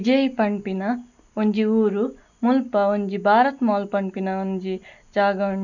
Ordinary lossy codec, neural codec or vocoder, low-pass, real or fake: none; none; 7.2 kHz; real